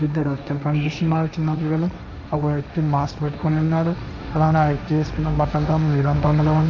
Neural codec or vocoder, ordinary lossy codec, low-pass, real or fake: codec, 16 kHz, 1.1 kbps, Voila-Tokenizer; none; none; fake